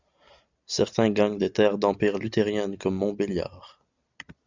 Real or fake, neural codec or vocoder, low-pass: real; none; 7.2 kHz